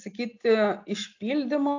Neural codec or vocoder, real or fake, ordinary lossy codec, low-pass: none; real; AAC, 48 kbps; 7.2 kHz